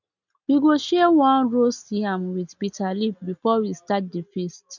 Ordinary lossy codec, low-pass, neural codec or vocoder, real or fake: none; 7.2 kHz; none; real